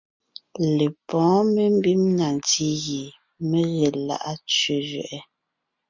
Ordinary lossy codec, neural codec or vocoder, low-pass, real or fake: MP3, 64 kbps; none; 7.2 kHz; real